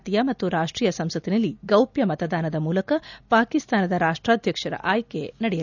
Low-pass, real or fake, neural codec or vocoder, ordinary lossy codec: 7.2 kHz; real; none; none